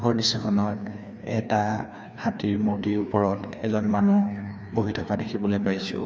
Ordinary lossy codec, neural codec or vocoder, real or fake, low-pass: none; codec, 16 kHz, 2 kbps, FreqCodec, larger model; fake; none